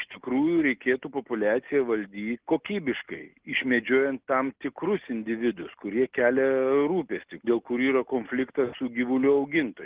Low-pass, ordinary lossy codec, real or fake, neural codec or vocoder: 3.6 kHz; Opus, 16 kbps; real; none